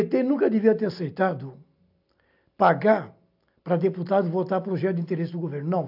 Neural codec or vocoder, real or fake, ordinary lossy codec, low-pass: none; real; AAC, 48 kbps; 5.4 kHz